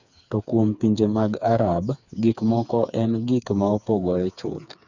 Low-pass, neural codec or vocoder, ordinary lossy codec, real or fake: 7.2 kHz; codec, 16 kHz, 4 kbps, FreqCodec, smaller model; none; fake